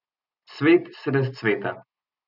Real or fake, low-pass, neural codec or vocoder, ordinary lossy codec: real; 5.4 kHz; none; none